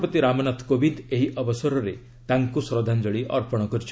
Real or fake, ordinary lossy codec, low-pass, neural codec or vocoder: real; none; none; none